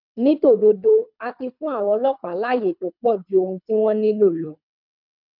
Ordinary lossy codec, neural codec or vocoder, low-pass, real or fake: none; codec, 24 kHz, 3 kbps, HILCodec; 5.4 kHz; fake